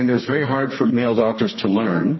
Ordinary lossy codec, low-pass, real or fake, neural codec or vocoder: MP3, 24 kbps; 7.2 kHz; fake; codec, 32 kHz, 1.9 kbps, SNAC